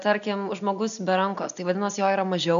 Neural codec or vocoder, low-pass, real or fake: none; 7.2 kHz; real